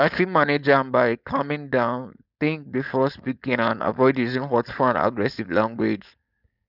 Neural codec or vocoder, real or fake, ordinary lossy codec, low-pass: codec, 16 kHz, 4.8 kbps, FACodec; fake; none; 5.4 kHz